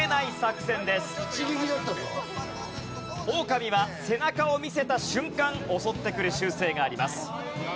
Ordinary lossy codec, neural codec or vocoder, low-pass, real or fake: none; none; none; real